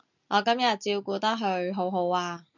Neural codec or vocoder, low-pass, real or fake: none; 7.2 kHz; real